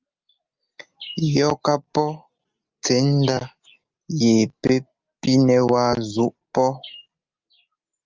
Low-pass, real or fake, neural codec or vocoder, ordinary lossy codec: 7.2 kHz; real; none; Opus, 24 kbps